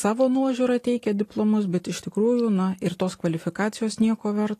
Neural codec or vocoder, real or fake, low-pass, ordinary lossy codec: none; real; 14.4 kHz; AAC, 48 kbps